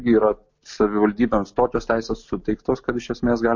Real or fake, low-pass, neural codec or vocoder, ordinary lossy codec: real; 7.2 kHz; none; MP3, 48 kbps